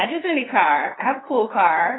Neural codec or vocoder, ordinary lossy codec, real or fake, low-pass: codec, 16 kHz, 2 kbps, FunCodec, trained on LibriTTS, 25 frames a second; AAC, 16 kbps; fake; 7.2 kHz